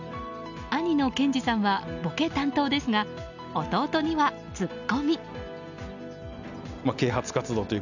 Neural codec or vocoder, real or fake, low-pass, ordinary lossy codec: none; real; 7.2 kHz; none